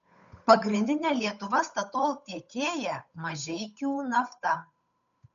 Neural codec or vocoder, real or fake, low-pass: codec, 16 kHz, 8 kbps, FunCodec, trained on Chinese and English, 25 frames a second; fake; 7.2 kHz